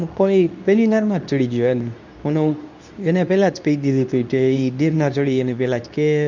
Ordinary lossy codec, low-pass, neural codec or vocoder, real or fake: none; 7.2 kHz; codec, 24 kHz, 0.9 kbps, WavTokenizer, medium speech release version 2; fake